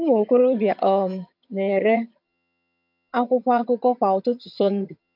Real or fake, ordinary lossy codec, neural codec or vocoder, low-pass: fake; MP3, 48 kbps; vocoder, 22.05 kHz, 80 mel bands, HiFi-GAN; 5.4 kHz